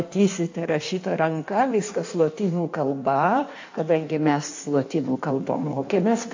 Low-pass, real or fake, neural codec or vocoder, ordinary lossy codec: 7.2 kHz; fake; codec, 16 kHz in and 24 kHz out, 1.1 kbps, FireRedTTS-2 codec; AAC, 48 kbps